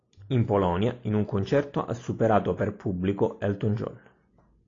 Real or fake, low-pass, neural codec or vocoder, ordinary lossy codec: real; 7.2 kHz; none; AAC, 32 kbps